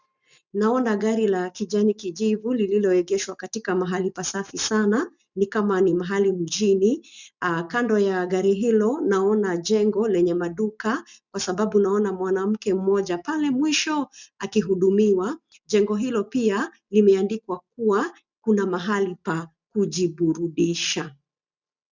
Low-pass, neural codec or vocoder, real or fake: 7.2 kHz; none; real